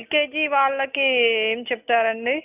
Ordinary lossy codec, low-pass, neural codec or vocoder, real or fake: none; 3.6 kHz; none; real